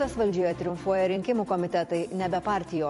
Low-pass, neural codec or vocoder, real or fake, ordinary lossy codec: 14.4 kHz; vocoder, 44.1 kHz, 128 mel bands every 256 samples, BigVGAN v2; fake; MP3, 48 kbps